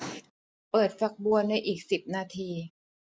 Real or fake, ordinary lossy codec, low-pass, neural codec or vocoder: real; none; none; none